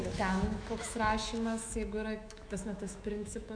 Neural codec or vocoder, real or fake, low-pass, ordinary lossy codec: autoencoder, 48 kHz, 128 numbers a frame, DAC-VAE, trained on Japanese speech; fake; 9.9 kHz; MP3, 96 kbps